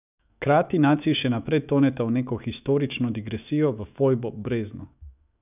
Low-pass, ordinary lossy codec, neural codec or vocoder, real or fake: 3.6 kHz; none; autoencoder, 48 kHz, 128 numbers a frame, DAC-VAE, trained on Japanese speech; fake